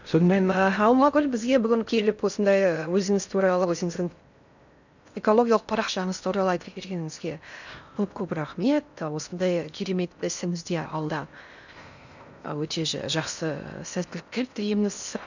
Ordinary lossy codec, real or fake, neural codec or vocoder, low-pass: none; fake; codec, 16 kHz in and 24 kHz out, 0.6 kbps, FocalCodec, streaming, 4096 codes; 7.2 kHz